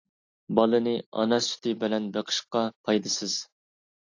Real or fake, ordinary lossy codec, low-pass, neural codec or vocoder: real; AAC, 48 kbps; 7.2 kHz; none